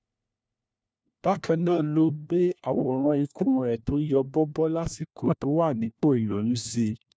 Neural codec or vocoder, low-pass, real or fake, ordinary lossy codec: codec, 16 kHz, 1 kbps, FunCodec, trained on LibriTTS, 50 frames a second; none; fake; none